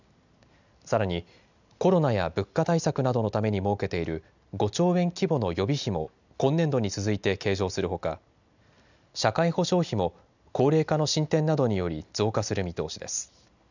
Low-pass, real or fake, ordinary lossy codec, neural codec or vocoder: 7.2 kHz; real; none; none